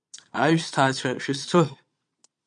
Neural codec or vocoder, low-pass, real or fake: vocoder, 22.05 kHz, 80 mel bands, Vocos; 9.9 kHz; fake